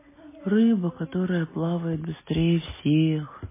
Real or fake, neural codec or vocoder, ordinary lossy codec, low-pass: real; none; MP3, 16 kbps; 3.6 kHz